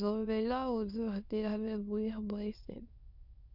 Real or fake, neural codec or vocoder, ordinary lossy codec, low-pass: fake; autoencoder, 22.05 kHz, a latent of 192 numbers a frame, VITS, trained on many speakers; Opus, 64 kbps; 5.4 kHz